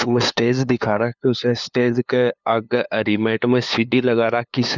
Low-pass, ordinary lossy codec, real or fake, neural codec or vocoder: 7.2 kHz; none; fake; codec, 16 kHz, 2 kbps, FunCodec, trained on LibriTTS, 25 frames a second